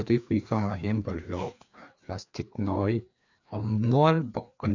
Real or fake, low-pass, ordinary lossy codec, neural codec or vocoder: fake; 7.2 kHz; none; codec, 16 kHz, 2 kbps, FreqCodec, larger model